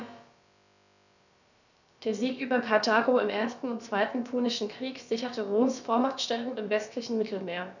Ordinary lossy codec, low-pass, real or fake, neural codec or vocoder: none; 7.2 kHz; fake; codec, 16 kHz, about 1 kbps, DyCAST, with the encoder's durations